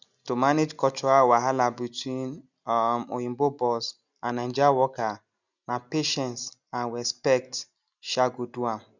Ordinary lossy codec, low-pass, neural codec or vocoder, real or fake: none; 7.2 kHz; none; real